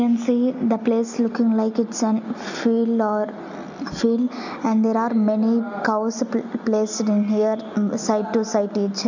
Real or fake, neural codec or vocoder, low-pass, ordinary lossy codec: real; none; 7.2 kHz; none